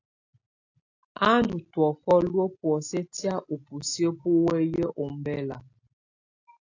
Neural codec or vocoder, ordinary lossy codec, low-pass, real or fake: none; AAC, 48 kbps; 7.2 kHz; real